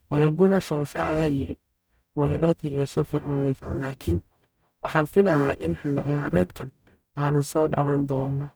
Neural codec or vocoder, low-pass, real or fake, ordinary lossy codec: codec, 44.1 kHz, 0.9 kbps, DAC; none; fake; none